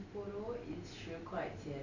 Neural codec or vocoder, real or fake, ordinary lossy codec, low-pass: none; real; none; 7.2 kHz